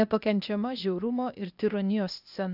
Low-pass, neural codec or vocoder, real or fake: 5.4 kHz; codec, 16 kHz, 0.8 kbps, ZipCodec; fake